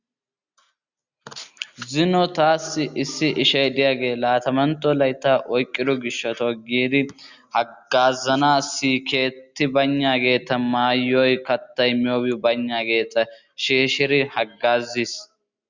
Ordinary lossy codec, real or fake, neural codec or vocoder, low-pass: Opus, 64 kbps; real; none; 7.2 kHz